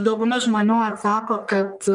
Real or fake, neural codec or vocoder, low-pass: fake; codec, 44.1 kHz, 1.7 kbps, Pupu-Codec; 10.8 kHz